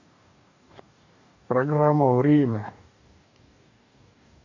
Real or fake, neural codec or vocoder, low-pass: fake; codec, 44.1 kHz, 2.6 kbps, DAC; 7.2 kHz